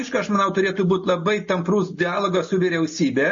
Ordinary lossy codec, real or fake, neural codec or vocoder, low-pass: MP3, 32 kbps; real; none; 7.2 kHz